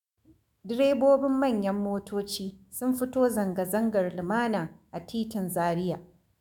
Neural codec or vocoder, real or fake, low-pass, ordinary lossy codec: autoencoder, 48 kHz, 128 numbers a frame, DAC-VAE, trained on Japanese speech; fake; 19.8 kHz; MP3, 96 kbps